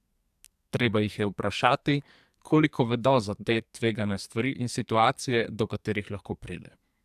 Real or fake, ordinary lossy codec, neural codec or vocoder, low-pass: fake; AAC, 96 kbps; codec, 44.1 kHz, 2.6 kbps, SNAC; 14.4 kHz